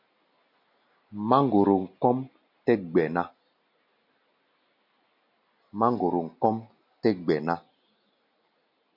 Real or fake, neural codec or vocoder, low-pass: real; none; 5.4 kHz